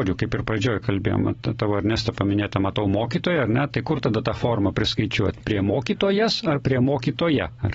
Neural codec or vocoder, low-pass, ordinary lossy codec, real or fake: none; 9.9 kHz; AAC, 24 kbps; real